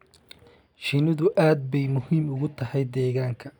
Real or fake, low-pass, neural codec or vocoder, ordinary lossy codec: real; 19.8 kHz; none; none